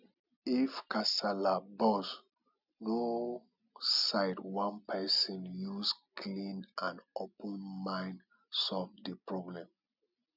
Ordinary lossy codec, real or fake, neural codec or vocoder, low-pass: none; real; none; 5.4 kHz